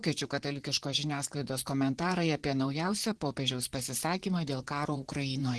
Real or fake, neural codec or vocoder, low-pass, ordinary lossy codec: fake; vocoder, 44.1 kHz, 128 mel bands, Pupu-Vocoder; 10.8 kHz; Opus, 16 kbps